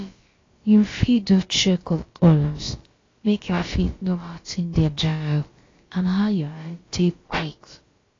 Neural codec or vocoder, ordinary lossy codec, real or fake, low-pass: codec, 16 kHz, about 1 kbps, DyCAST, with the encoder's durations; AAC, 32 kbps; fake; 7.2 kHz